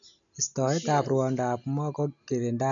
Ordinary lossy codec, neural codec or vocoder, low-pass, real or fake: none; none; 7.2 kHz; real